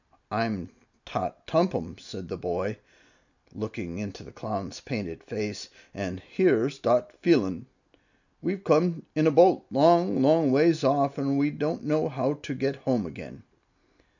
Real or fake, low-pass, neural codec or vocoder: real; 7.2 kHz; none